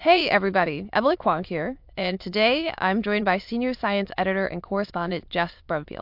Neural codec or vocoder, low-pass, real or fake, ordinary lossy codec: autoencoder, 22.05 kHz, a latent of 192 numbers a frame, VITS, trained on many speakers; 5.4 kHz; fake; MP3, 48 kbps